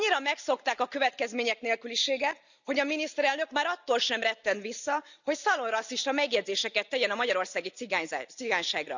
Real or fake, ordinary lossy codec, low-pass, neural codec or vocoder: real; none; 7.2 kHz; none